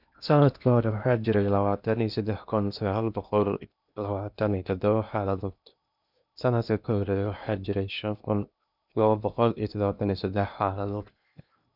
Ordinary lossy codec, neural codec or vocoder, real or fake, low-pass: none; codec, 16 kHz in and 24 kHz out, 0.8 kbps, FocalCodec, streaming, 65536 codes; fake; 5.4 kHz